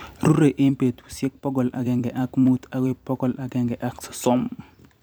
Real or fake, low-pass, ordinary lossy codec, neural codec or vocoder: fake; none; none; vocoder, 44.1 kHz, 128 mel bands every 256 samples, BigVGAN v2